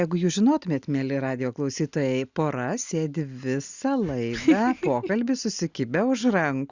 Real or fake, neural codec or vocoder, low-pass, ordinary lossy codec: real; none; 7.2 kHz; Opus, 64 kbps